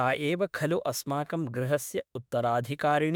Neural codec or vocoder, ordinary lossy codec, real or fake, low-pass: autoencoder, 48 kHz, 32 numbers a frame, DAC-VAE, trained on Japanese speech; none; fake; none